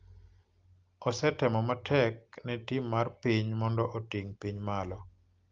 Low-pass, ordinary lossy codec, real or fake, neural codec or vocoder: 7.2 kHz; Opus, 24 kbps; real; none